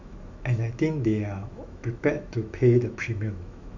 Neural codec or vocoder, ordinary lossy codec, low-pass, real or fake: autoencoder, 48 kHz, 128 numbers a frame, DAC-VAE, trained on Japanese speech; AAC, 48 kbps; 7.2 kHz; fake